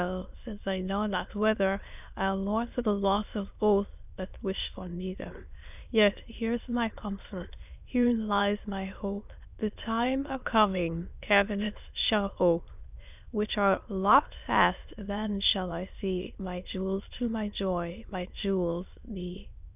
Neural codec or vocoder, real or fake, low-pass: autoencoder, 22.05 kHz, a latent of 192 numbers a frame, VITS, trained on many speakers; fake; 3.6 kHz